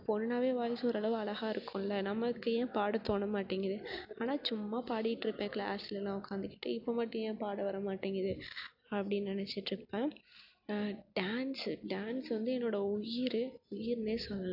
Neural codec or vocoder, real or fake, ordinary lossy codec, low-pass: none; real; AAC, 48 kbps; 5.4 kHz